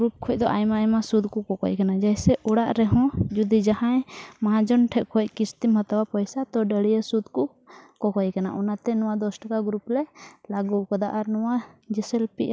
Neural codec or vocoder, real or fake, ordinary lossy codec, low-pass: none; real; none; none